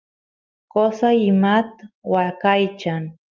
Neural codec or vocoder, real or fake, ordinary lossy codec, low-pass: none; real; Opus, 32 kbps; 7.2 kHz